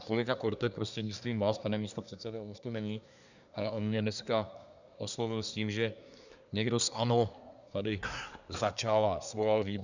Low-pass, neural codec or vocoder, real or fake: 7.2 kHz; codec, 24 kHz, 1 kbps, SNAC; fake